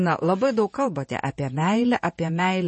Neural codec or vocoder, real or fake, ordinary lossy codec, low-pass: vocoder, 48 kHz, 128 mel bands, Vocos; fake; MP3, 32 kbps; 10.8 kHz